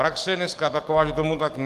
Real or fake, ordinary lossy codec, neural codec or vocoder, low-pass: fake; Opus, 24 kbps; codec, 44.1 kHz, 7.8 kbps, DAC; 14.4 kHz